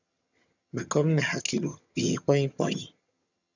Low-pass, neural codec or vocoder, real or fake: 7.2 kHz; vocoder, 22.05 kHz, 80 mel bands, HiFi-GAN; fake